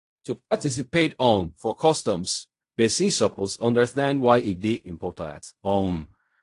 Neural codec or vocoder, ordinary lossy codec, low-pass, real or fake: codec, 16 kHz in and 24 kHz out, 0.4 kbps, LongCat-Audio-Codec, fine tuned four codebook decoder; AAC, 48 kbps; 10.8 kHz; fake